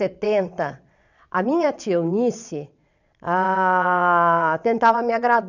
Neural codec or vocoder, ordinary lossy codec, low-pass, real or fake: vocoder, 22.05 kHz, 80 mel bands, WaveNeXt; none; 7.2 kHz; fake